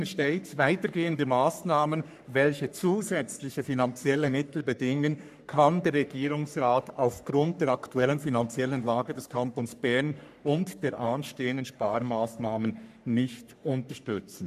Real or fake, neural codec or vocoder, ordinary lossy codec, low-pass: fake; codec, 44.1 kHz, 3.4 kbps, Pupu-Codec; none; 14.4 kHz